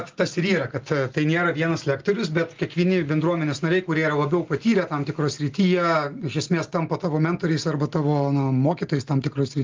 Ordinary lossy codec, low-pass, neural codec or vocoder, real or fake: Opus, 32 kbps; 7.2 kHz; none; real